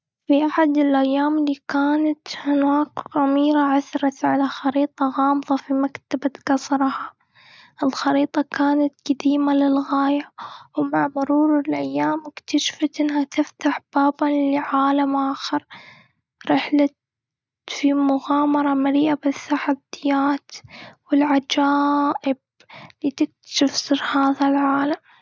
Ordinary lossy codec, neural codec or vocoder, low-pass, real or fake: none; none; none; real